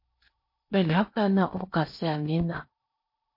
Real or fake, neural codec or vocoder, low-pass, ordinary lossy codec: fake; codec, 16 kHz in and 24 kHz out, 0.8 kbps, FocalCodec, streaming, 65536 codes; 5.4 kHz; MP3, 32 kbps